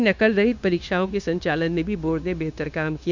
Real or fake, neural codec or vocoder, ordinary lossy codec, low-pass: fake; codec, 16 kHz, 0.9 kbps, LongCat-Audio-Codec; none; 7.2 kHz